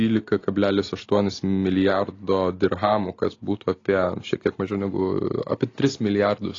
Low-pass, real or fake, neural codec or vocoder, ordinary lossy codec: 7.2 kHz; real; none; AAC, 32 kbps